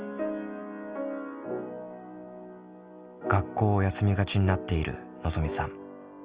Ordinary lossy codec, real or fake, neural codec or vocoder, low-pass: Opus, 24 kbps; real; none; 3.6 kHz